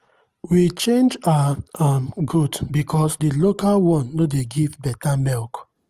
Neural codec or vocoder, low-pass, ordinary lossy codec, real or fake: none; 14.4 kHz; Opus, 24 kbps; real